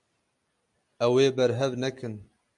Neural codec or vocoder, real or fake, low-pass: none; real; 10.8 kHz